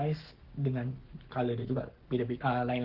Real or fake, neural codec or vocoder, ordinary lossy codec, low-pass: fake; codec, 44.1 kHz, 7.8 kbps, Pupu-Codec; Opus, 16 kbps; 5.4 kHz